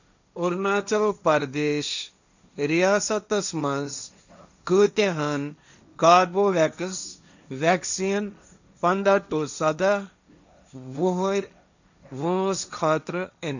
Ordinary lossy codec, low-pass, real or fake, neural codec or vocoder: none; 7.2 kHz; fake; codec, 16 kHz, 1.1 kbps, Voila-Tokenizer